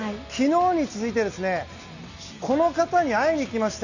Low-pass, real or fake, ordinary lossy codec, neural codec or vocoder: 7.2 kHz; real; none; none